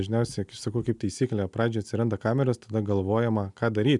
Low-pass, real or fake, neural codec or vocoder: 10.8 kHz; real; none